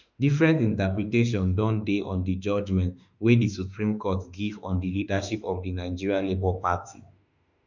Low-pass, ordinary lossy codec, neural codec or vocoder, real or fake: 7.2 kHz; none; autoencoder, 48 kHz, 32 numbers a frame, DAC-VAE, trained on Japanese speech; fake